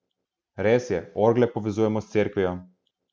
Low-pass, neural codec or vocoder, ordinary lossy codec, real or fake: none; none; none; real